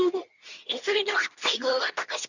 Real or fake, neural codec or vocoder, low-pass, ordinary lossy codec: fake; codec, 24 kHz, 0.9 kbps, WavTokenizer, medium speech release version 2; 7.2 kHz; none